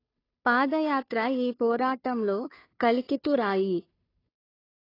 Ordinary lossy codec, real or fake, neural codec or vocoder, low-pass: AAC, 24 kbps; fake; codec, 16 kHz, 2 kbps, FunCodec, trained on Chinese and English, 25 frames a second; 5.4 kHz